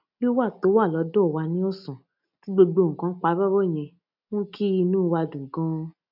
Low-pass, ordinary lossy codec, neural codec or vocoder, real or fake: 5.4 kHz; none; none; real